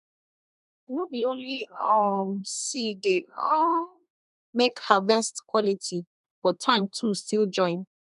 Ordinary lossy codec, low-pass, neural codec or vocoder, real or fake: none; 10.8 kHz; codec, 24 kHz, 1 kbps, SNAC; fake